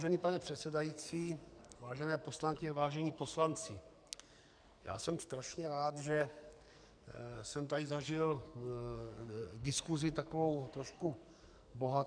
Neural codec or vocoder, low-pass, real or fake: codec, 44.1 kHz, 2.6 kbps, SNAC; 9.9 kHz; fake